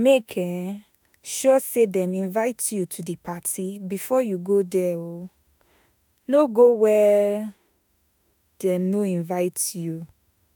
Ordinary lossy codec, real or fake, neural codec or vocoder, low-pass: none; fake; autoencoder, 48 kHz, 32 numbers a frame, DAC-VAE, trained on Japanese speech; none